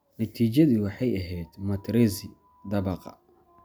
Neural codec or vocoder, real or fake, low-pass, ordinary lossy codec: none; real; none; none